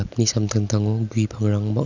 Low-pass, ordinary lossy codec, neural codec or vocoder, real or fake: 7.2 kHz; none; none; real